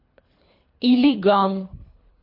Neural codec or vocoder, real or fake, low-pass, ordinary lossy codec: codec, 24 kHz, 3 kbps, HILCodec; fake; 5.4 kHz; MP3, 32 kbps